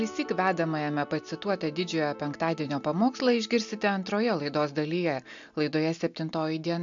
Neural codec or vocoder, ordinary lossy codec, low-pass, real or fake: none; AAC, 64 kbps; 7.2 kHz; real